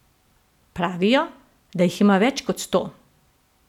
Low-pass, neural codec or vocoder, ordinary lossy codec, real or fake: 19.8 kHz; none; none; real